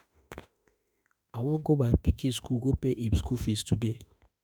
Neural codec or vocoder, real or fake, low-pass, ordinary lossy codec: autoencoder, 48 kHz, 32 numbers a frame, DAC-VAE, trained on Japanese speech; fake; none; none